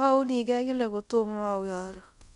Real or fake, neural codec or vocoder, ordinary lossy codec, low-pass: fake; codec, 24 kHz, 0.5 kbps, DualCodec; none; 10.8 kHz